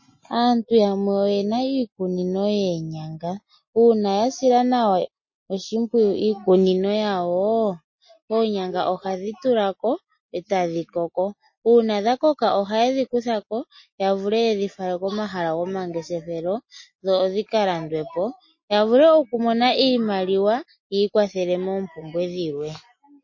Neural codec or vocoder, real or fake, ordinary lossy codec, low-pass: none; real; MP3, 32 kbps; 7.2 kHz